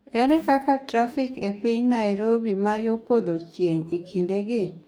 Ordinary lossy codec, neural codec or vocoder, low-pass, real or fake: none; codec, 44.1 kHz, 2.6 kbps, DAC; none; fake